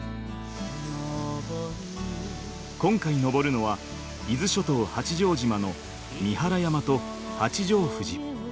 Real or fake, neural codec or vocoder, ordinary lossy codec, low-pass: real; none; none; none